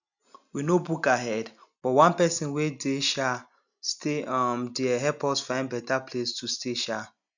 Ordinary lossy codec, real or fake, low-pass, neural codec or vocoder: none; real; 7.2 kHz; none